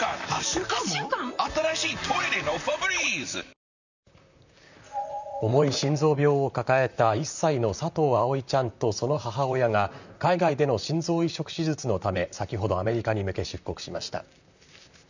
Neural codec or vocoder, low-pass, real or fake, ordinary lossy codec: vocoder, 44.1 kHz, 128 mel bands, Pupu-Vocoder; 7.2 kHz; fake; none